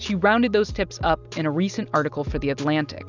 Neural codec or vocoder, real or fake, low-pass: none; real; 7.2 kHz